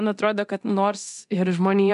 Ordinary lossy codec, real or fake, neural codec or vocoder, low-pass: MP3, 64 kbps; fake; codec, 24 kHz, 0.9 kbps, DualCodec; 10.8 kHz